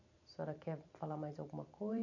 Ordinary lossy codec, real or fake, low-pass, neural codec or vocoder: none; fake; 7.2 kHz; vocoder, 44.1 kHz, 128 mel bands every 512 samples, BigVGAN v2